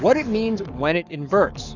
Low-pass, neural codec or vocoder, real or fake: 7.2 kHz; codec, 44.1 kHz, 7.8 kbps, Pupu-Codec; fake